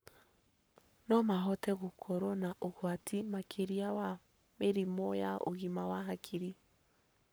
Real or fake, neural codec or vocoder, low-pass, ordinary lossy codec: fake; codec, 44.1 kHz, 7.8 kbps, Pupu-Codec; none; none